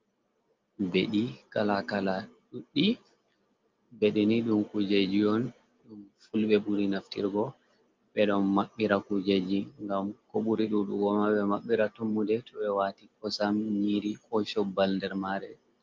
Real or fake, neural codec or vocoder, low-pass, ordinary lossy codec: real; none; 7.2 kHz; Opus, 24 kbps